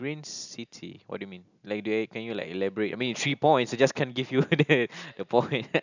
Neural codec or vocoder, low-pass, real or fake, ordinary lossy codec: none; 7.2 kHz; real; none